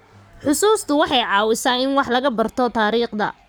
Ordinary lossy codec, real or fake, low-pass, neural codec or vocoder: none; fake; none; codec, 44.1 kHz, 7.8 kbps, Pupu-Codec